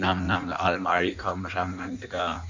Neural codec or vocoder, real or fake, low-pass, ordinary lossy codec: codec, 16 kHz in and 24 kHz out, 1.1 kbps, FireRedTTS-2 codec; fake; 7.2 kHz; none